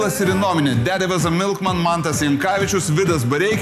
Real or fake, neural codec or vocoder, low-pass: real; none; 14.4 kHz